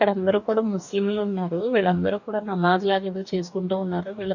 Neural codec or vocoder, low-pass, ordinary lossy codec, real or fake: codec, 44.1 kHz, 2.6 kbps, DAC; 7.2 kHz; none; fake